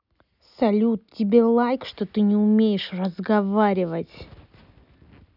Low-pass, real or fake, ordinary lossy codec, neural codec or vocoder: 5.4 kHz; real; none; none